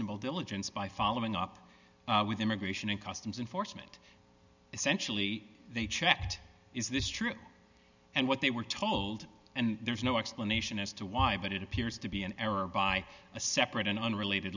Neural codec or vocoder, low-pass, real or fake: none; 7.2 kHz; real